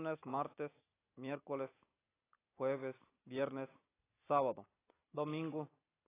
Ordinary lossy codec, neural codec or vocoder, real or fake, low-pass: AAC, 16 kbps; none; real; 3.6 kHz